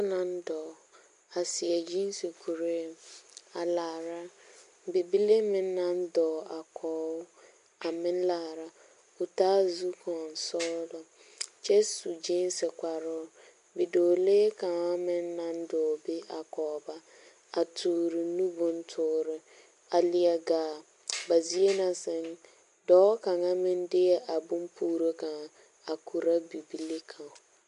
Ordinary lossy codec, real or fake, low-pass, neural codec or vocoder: MP3, 64 kbps; real; 10.8 kHz; none